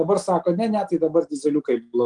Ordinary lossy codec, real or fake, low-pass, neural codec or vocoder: Opus, 24 kbps; real; 9.9 kHz; none